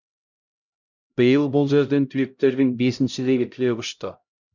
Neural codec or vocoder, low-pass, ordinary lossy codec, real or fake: codec, 16 kHz, 0.5 kbps, X-Codec, HuBERT features, trained on LibriSpeech; 7.2 kHz; none; fake